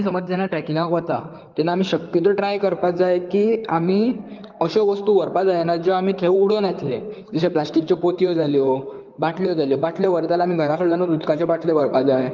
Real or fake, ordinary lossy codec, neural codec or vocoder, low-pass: fake; Opus, 32 kbps; codec, 16 kHz in and 24 kHz out, 2.2 kbps, FireRedTTS-2 codec; 7.2 kHz